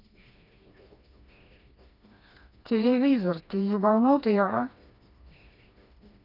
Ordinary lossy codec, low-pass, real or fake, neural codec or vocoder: none; 5.4 kHz; fake; codec, 16 kHz, 2 kbps, FreqCodec, smaller model